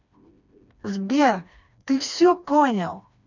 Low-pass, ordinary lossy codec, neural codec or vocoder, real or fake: 7.2 kHz; none; codec, 16 kHz, 2 kbps, FreqCodec, smaller model; fake